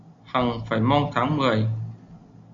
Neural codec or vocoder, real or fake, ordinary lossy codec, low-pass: none; real; Opus, 64 kbps; 7.2 kHz